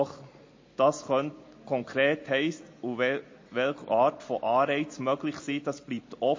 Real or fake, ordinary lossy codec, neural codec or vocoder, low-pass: real; MP3, 32 kbps; none; 7.2 kHz